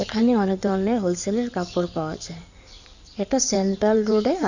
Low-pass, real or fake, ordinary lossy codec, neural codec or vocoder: 7.2 kHz; fake; none; codec, 16 kHz in and 24 kHz out, 2.2 kbps, FireRedTTS-2 codec